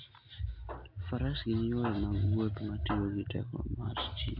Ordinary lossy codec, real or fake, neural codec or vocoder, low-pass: AAC, 48 kbps; real; none; 5.4 kHz